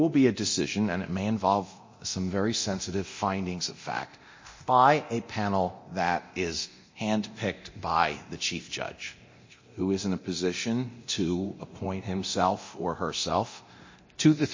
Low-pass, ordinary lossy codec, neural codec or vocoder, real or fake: 7.2 kHz; MP3, 32 kbps; codec, 24 kHz, 0.9 kbps, DualCodec; fake